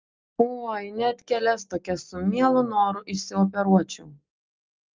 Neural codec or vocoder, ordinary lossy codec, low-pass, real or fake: none; Opus, 24 kbps; 7.2 kHz; real